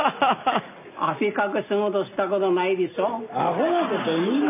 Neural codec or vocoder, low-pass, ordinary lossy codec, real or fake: none; 3.6 kHz; none; real